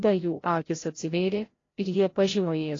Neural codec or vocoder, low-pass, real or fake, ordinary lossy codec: codec, 16 kHz, 0.5 kbps, FreqCodec, larger model; 7.2 kHz; fake; AAC, 32 kbps